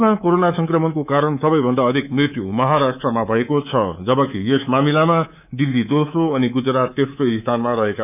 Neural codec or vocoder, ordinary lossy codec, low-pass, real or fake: codec, 24 kHz, 3.1 kbps, DualCodec; none; 3.6 kHz; fake